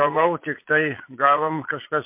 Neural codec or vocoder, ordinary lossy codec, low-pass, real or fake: vocoder, 22.05 kHz, 80 mel bands, Vocos; MP3, 24 kbps; 3.6 kHz; fake